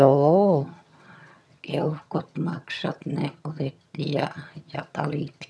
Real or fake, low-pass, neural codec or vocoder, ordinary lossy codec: fake; none; vocoder, 22.05 kHz, 80 mel bands, HiFi-GAN; none